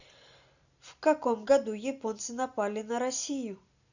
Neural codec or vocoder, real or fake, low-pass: none; real; 7.2 kHz